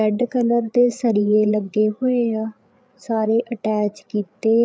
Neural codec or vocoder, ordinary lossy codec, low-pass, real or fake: codec, 16 kHz, 8 kbps, FreqCodec, larger model; none; none; fake